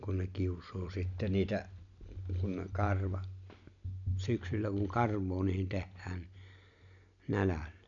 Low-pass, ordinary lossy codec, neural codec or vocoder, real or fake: 7.2 kHz; none; none; real